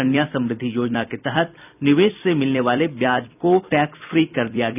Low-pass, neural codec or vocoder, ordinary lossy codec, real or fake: 3.6 kHz; vocoder, 44.1 kHz, 128 mel bands every 256 samples, BigVGAN v2; none; fake